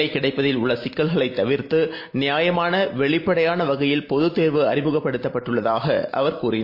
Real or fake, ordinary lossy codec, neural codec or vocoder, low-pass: real; MP3, 32 kbps; none; 5.4 kHz